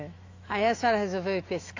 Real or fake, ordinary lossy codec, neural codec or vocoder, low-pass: fake; AAC, 32 kbps; autoencoder, 48 kHz, 128 numbers a frame, DAC-VAE, trained on Japanese speech; 7.2 kHz